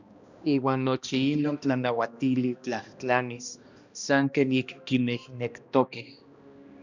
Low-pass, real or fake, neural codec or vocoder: 7.2 kHz; fake; codec, 16 kHz, 1 kbps, X-Codec, HuBERT features, trained on balanced general audio